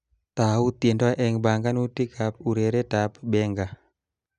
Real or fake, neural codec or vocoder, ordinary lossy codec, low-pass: real; none; none; 9.9 kHz